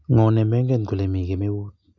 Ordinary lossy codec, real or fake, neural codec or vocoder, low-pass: none; real; none; 7.2 kHz